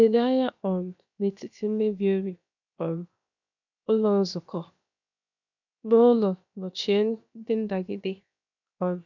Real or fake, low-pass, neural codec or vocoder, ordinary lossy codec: fake; 7.2 kHz; codec, 16 kHz, 0.7 kbps, FocalCodec; none